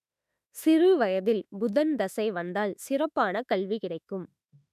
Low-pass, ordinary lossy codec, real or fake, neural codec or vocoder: 14.4 kHz; none; fake; autoencoder, 48 kHz, 32 numbers a frame, DAC-VAE, trained on Japanese speech